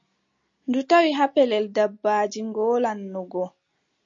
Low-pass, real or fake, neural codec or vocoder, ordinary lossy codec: 7.2 kHz; real; none; MP3, 48 kbps